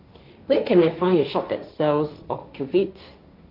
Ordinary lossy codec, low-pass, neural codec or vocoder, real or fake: Opus, 64 kbps; 5.4 kHz; codec, 16 kHz, 1.1 kbps, Voila-Tokenizer; fake